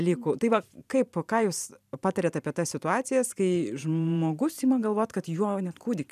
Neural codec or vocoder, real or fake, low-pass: vocoder, 44.1 kHz, 128 mel bands every 512 samples, BigVGAN v2; fake; 14.4 kHz